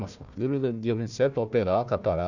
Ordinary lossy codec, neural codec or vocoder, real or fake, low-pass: none; codec, 16 kHz, 1 kbps, FunCodec, trained on Chinese and English, 50 frames a second; fake; 7.2 kHz